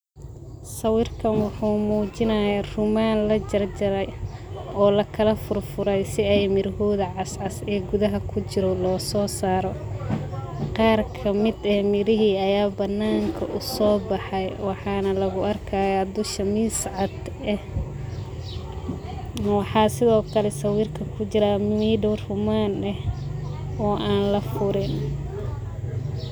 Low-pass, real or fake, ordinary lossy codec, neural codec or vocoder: none; real; none; none